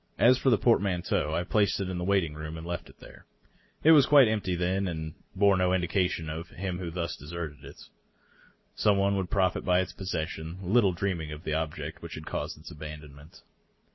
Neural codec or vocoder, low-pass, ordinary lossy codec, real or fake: none; 7.2 kHz; MP3, 24 kbps; real